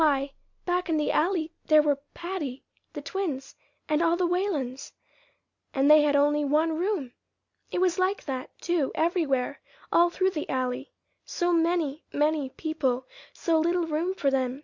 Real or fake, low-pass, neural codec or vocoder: real; 7.2 kHz; none